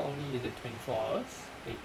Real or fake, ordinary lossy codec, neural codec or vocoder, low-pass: fake; none; vocoder, 44.1 kHz, 128 mel bands, Pupu-Vocoder; 19.8 kHz